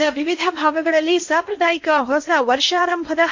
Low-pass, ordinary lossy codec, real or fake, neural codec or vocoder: 7.2 kHz; MP3, 48 kbps; fake; codec, 16 kHz in and 24 kHz out, 0.8 kbps, FocalCodec, streaming, 65536 codes